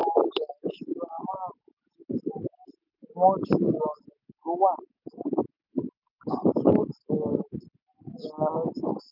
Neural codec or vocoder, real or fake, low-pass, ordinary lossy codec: none; real; 5.4 kHz; none